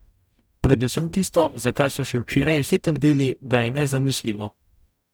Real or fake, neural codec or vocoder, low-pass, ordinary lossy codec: fake; codec, 44.1 kHz, 0.9 kbps, DAC; none; none